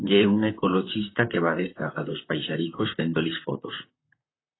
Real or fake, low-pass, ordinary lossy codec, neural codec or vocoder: fake; 7.2 kHz; AAC, 16 kbps; vocoder, 44.1 kHz, 128 mel bands, Pupu-Vocoder